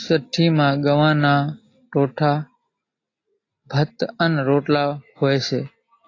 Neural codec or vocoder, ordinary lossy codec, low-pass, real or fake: none; AAC, 32 kbps; 7.2 kHz; real